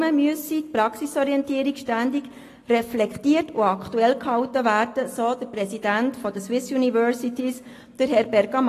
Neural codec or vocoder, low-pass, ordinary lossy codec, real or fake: none; 14.4 kHz; AAC, 48 kbps; real